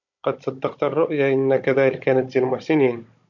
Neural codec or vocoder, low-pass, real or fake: codec, 16 kHz, 16 kbps, FunCodec, trained on Chinese and English, 50 frames a second; 7.2 kHz; fake